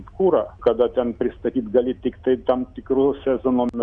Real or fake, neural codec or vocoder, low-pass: real; none; 9.9 kHz